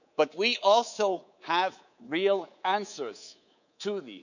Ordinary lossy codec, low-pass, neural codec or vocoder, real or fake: none; 7.2 kHz; codec, 24 kHz, 3.1 kbps, DualCodec; fake